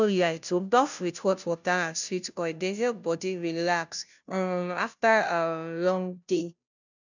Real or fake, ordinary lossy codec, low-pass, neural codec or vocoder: fake; none; 7.2 kHz; codec, 16 kHz, 0.5 kbps, FunCodec, trained on Chinese and English, 25 frames a second